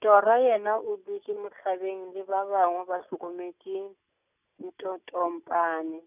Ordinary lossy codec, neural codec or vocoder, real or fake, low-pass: none; none; real; 3.6 kHz